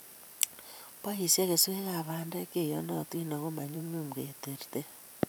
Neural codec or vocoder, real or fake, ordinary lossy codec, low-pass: vocoder, 44.1 kHz, 128 mel bands every 512 samples, BigVGAN v2; fake; none; none